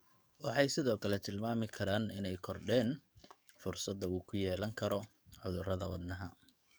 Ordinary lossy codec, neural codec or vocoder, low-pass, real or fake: none; codec, 44.1 kHz, 7.8 kbps, DAC; none; fake